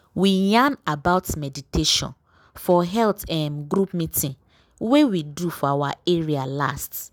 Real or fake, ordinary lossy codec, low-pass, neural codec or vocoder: real; none; none; none